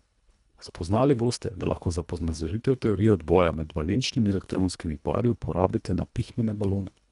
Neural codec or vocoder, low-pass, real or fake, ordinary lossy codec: codec, 24 kHz, 1.5 kbps, HILCodec; 10.8 kHz; fake; none